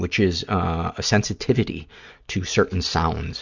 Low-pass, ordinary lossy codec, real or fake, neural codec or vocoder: 7.2 kHz; Opus, 64 kbps; real; none